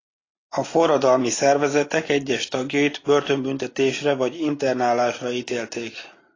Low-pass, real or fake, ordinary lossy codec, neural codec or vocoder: 7.2 kHz; real; AAC, 32 kbps; none